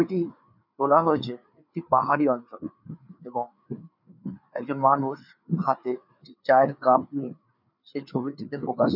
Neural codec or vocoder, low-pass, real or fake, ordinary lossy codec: codec, 16 kHz, 4 kbps, FreqCodec, larger model; 5.4 kHz; fake; none